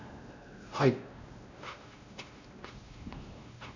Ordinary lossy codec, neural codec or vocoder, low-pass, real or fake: none; codec, 16 kHz, 1 kbps, X-Codec, WavLM features, trained on Multilingual LibriSpeech; 7.2 kHz; fake